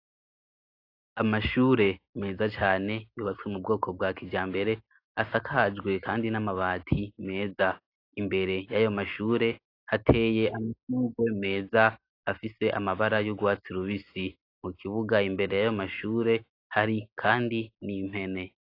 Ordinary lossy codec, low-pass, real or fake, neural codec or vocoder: AAC, 32 kbps; 5.4 kHz; real; none